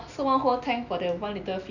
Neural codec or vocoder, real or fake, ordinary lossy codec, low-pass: none; real; none; 7.2 kHz